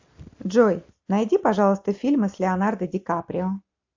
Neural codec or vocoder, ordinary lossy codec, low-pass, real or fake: none; AAC, 48 kbps; 7.2 kHz; real